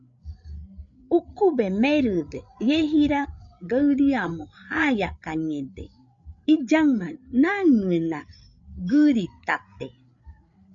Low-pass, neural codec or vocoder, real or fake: 7.2 kHz; codec, 16 kHz, 8 kbps, FreqCodec, larger model; fake